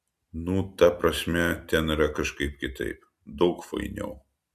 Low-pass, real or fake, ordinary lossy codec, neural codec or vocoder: 14.4 kHz; real; MP3, 96 kbps; none